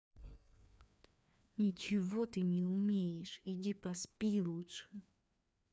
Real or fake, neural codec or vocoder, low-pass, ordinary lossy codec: fake; codec, 16 kHz, 2 kbps, FreqCodec, larger model; none; none